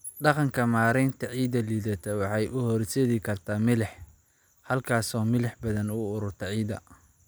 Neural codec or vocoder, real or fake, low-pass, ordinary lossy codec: none; real; none; none